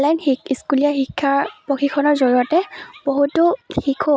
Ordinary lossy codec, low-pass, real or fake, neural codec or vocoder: none; none; real; none